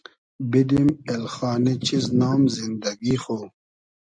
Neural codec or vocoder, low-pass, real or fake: none; 9.9 kHz; real